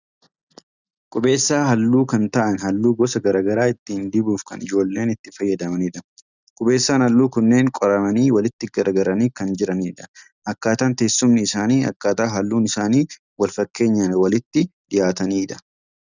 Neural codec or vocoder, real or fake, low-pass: none; real; 7.2 kHz